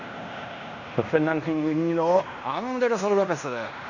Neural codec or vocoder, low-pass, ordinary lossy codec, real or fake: codec, 16 kHz in and 24 kHz out, 0.9 kbps, LongCat-Audio-Codec, fine tuned four codebook decoder; 7.2 kHz; none; fake